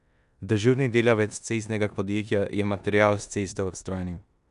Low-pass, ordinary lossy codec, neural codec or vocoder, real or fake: 10.8 kHz; none; codec, 16 kHz in and 24 kHz out, 0.9 kbps, LongCat-Audio-Codec, four codebook decoder; fake